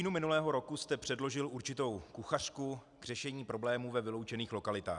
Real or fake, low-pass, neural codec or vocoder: real; 10.8 kHz; none